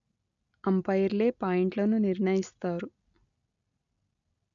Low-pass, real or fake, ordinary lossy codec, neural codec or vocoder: 7.2 kHz; real; none; none